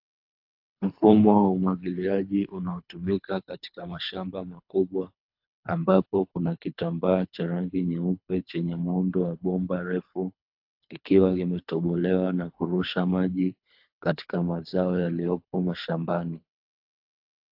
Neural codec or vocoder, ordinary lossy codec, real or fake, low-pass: codec, 24 kHz, 3 kbps, HILCodec; AAC, 48 kbps; fake; 5.4 kHz